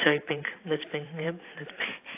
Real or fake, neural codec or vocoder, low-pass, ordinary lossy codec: fake; vocoder, 44.1 kHz, 128 mel bands every 512 samples, BigVGAN v2; 3.6 kHz; AAC, 24 kbps